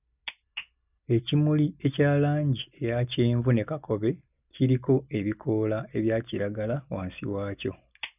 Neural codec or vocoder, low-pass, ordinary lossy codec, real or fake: none; 3.6 kHz; none; real